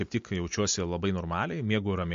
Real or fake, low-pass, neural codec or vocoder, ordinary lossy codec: real; 7.2 kHz; none; MP3, 48 kbps